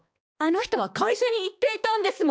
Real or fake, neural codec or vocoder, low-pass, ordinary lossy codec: fake; codec, 16 kHz, 2 kbps, X-Codec, HuBERT features, trained on balanced general audio; none; none